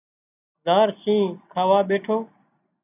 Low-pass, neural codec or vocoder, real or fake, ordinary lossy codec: 3.6 kHz; none; real; AAC, 24 kbps